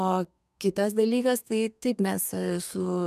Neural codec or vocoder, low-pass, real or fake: codec, 32 kHz, 1.9 kbps, SNAC; 14.4 kHz; fake